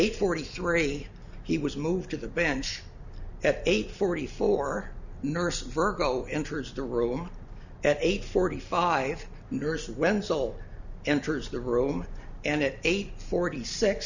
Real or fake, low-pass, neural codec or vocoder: real; 7.2 kHz; none